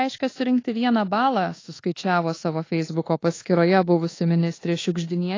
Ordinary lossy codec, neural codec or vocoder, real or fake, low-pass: AAC, 32 kbps; codec, 24 kHz, 1.2 kbps, DualCodec; fake; 7.2 kHz